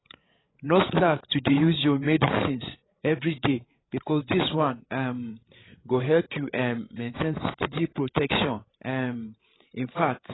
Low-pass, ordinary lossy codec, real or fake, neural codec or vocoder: 7.2 kHz; AAC, 16 kbps; fake; codec, 16 kHz, 8 kbps, FreqCodec, larger model